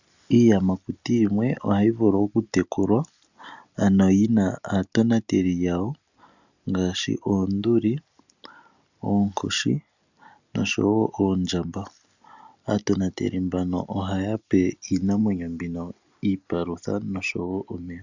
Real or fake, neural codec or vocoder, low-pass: real; none; 7.2 kHz